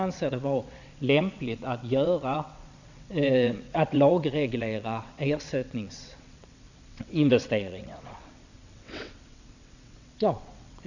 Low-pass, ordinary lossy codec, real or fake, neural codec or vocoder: 7.2 kHz; none; fake; vocoder, 22.05 kHz, 80 mel bands, WaveNeXt